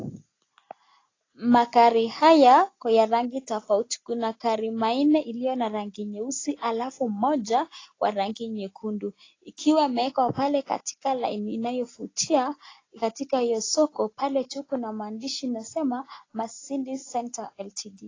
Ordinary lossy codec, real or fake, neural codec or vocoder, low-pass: AAC, 32 kbps; real; none; 7.2 kHz